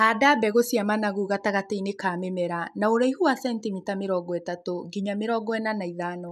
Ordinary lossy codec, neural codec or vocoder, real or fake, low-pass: none; none; real; 14.4 kHz